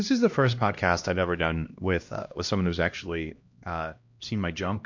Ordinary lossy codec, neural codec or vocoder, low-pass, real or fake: MP3, 48 kbps; codec, 16 kHz, 1 kbps, X-Codec, HuBERT features, trained on LibriSpeech; 7.2 kHz; fake